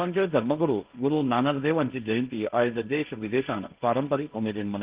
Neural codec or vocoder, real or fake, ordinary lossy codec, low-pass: codec, 16 kHz, 1.1 kbps, Voila-Tokenizer; fake; Opus, 32 kbps; 3.6 kHz